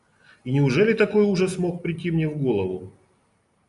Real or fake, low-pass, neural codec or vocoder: real; 10.8 kHz; none